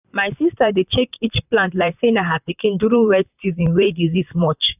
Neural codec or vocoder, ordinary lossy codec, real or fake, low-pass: vocoder, 44.1 kHz, 128 mel bands, Pupu-Vocoder; none; fake; 3.6 kHz